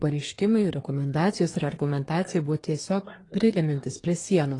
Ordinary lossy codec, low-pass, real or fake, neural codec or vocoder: AAC, 32 kbps; 10.8 kHz; fake; codec, 24 kHz, 1 kbps, SNAC